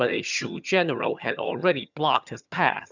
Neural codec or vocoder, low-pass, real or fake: vocoder, 22.05 kHz, 80 mel bands, HiFi-GAN; 7.2 kHz; fake